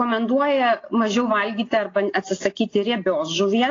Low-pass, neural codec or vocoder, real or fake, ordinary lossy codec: 7.2 kHz; none; real; AAC, 32 kbps